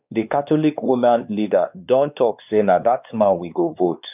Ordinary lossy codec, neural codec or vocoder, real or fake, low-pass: none; codec, 16 kHz, 2 kbps, X-Codec, WavLM features, trained on Multilingual LibriSpeech; fake; 3.6 kHz